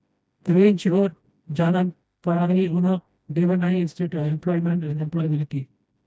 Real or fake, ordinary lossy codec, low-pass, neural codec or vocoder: fake; none; none; codec, 16 kHz, 1 kbps, FreqCodec, smaller model